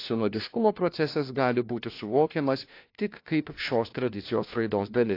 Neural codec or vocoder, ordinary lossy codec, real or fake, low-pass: codec, 16 kHz, 1 kbps, FunCodec, trained on LibriTTS, 50 frames a second; AAC, 32 kbps; fake; 5.4 kHz